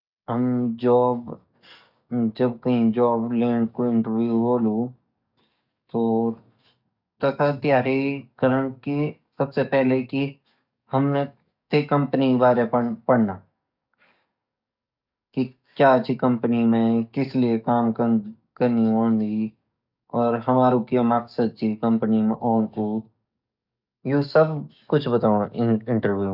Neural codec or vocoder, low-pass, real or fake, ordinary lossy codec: codec, 44.1 kHz, 7.8 kbps, DAC; 5.4 kHz; fake; none